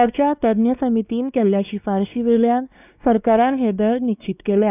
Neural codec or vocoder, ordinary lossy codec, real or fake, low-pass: codec, 16 kHz, 2 kbps, X-Codec, WavLM features, trained on Multilingual LibriSpeech; none; fake; 3.6 kHz